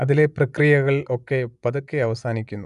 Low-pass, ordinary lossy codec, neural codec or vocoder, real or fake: 10.8 kHz; none; vocoder, 24 kHz, 100 mel bands, Vocos; fake